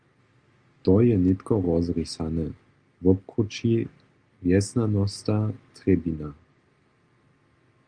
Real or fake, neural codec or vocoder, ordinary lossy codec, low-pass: real; none; Opus, 32 kbps; 9.9 kHz